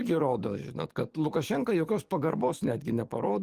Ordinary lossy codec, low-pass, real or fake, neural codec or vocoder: Opus, 24 kbps; 14.4 kHz; fake; codec, 44.1 kHz, 7.8 kbps, DAC